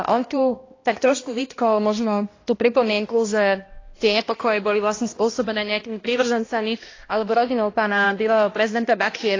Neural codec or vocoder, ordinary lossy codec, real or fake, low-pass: codec, 16 kHz, 1 kbps, X-Codec, HuBERT features, trained on balanced general audio; AAC, 32 kbps; fake; 7.2 kHz